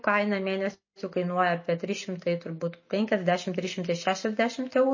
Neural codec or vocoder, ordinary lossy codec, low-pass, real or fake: none; MP3, 32 kbps; 7.2 kHz; real